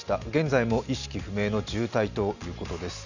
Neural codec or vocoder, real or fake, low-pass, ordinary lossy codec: none; real; 7.2 kHz; none